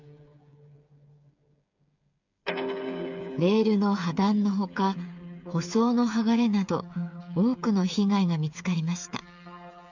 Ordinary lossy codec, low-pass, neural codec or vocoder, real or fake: none; 7.2 kHz; codec, 16 kHz, 8 kbps, FreqCodec, smaller model; fake